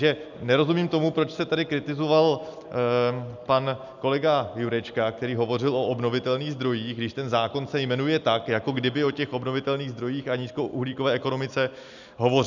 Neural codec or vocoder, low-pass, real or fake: none; 7.2 kHz; real